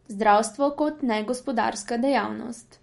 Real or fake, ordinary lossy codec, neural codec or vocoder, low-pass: real; MP3, 48 kbps; none; 19.8 kHz